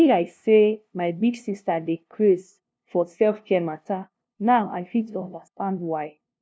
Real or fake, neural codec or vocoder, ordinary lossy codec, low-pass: fake; codec, 16 kHz, 0.5 kbps, FunCodec, trained on LibriTTS, 25 frames a second; none; none